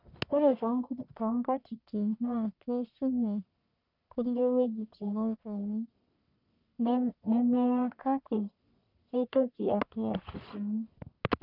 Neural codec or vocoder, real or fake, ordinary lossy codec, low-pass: codec, 44.1 kHz, 1.7 kbps, Pupu-Codec; fake; none; 5.4 kHz